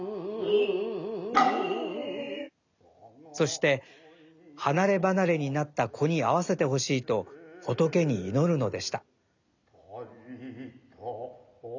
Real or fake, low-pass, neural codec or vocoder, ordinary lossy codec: real; 7.2 kHz; none; none